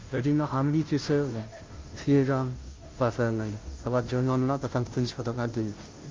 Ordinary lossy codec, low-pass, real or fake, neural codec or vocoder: Opus, 24 kbps; 7.2 kHz; fake; codec, 16 kHz, 0.5 kbps, FunCodec, trained on Chinese and English, 25 frames a second